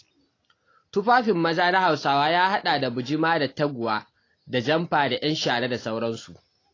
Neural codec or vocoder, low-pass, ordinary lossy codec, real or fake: none; 7.2 kHz; AAC, 32 kbps; real